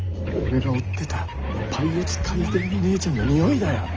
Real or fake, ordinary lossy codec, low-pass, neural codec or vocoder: real; Opus, 24 kbps; 7.2 kHz; none